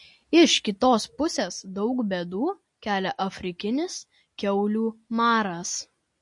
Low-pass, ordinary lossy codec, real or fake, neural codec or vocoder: 10.8 kHz; MP3, 48 kbps; real; none